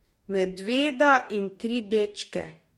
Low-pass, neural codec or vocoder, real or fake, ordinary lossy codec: 19.8 kHz; codec, 44.1 kHz, 2.6 kbps, DAC; fake; MP3, 64 kbps